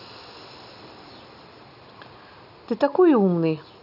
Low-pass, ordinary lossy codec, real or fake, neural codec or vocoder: 5.4 kHz; none; real; none